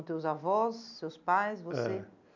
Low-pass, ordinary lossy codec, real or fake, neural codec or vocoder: 7.2 kHz; none; real; none